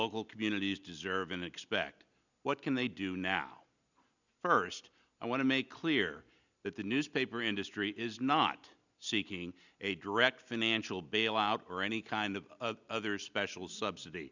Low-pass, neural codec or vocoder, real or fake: 7.2 kHz; none; real